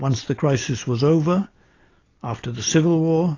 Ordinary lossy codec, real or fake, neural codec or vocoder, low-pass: AAC, 32 kbps; real; none; 7.2 kHz